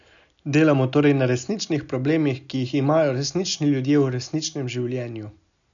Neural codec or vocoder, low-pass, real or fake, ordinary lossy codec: none; 7.2 kHz; real; none